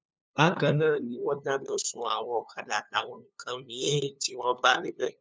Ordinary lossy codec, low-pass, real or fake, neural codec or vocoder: none; none; fake; codec, 16 kHz, 2 kbps, FunCodec, trained on LibriTTS, 25 frames a second